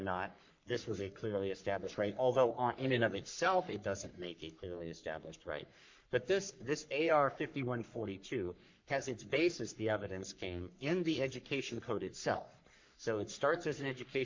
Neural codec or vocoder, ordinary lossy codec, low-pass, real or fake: codec, 44.1 kHz, 3.4 kbps, Pupu-Codec; MP3, 48 kbps; 7.2 kHz; fake